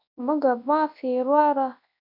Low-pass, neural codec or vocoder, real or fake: 5.4 kHz; codec, 24 kHz, 0.9 kbps, WavTokenizer, large speech release; fake